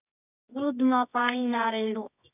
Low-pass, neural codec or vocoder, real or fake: 3.6 kHz; codec, 24 kHz, 0.9 kbps, WavTokenizer, medium music audio release; fake